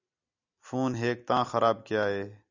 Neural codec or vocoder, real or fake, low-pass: none; real; 7.2 kHz